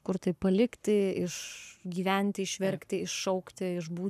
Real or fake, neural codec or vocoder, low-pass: fake; codec, 44.1 kHz, 7.8 kbps, Pupu-Codec; 14.4 kHz